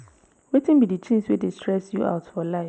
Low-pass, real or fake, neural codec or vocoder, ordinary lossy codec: none; real; none; none